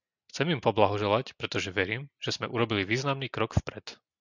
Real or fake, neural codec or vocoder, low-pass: real; none; 7.2 kHz